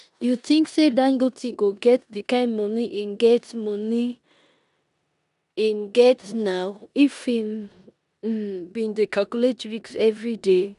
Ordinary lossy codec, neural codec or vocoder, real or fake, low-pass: none; codec, 16 kHz in and 24 kHz out, 0.9 kbps, LongCat-Audio-Codec, four codebook decoder; fake; 10.8 kHz